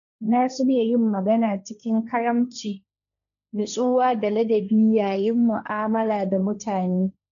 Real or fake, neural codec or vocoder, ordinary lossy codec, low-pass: fake; codec, 16 kHz, 1.1 kbps, Voila-Tokenizer; none; 7.2 kHz